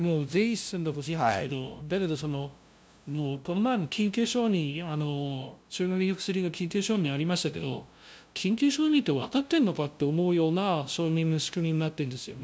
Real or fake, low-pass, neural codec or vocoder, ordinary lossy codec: fake; none; codec, 16 kHz, 0.5 kbps, FunCodec, trained on LibriTTS, 25 frames a second; none